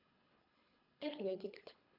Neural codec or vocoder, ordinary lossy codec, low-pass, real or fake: codec, 24 kHz, 3 kbps, HILCodec; none; 5.4 kHz; fake